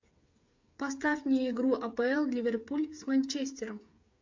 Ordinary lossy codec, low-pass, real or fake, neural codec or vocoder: MP3, 48 kbps; 7.2 kHz; fake; codec, 16 kHz, 8 kbps, FreqCodec, smaller model